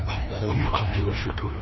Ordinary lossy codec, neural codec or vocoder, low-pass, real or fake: MP3, 24 kbps; codec, 16 kHz, 1 kbps, FreqCodec, larger model; 7.2 kHz; fake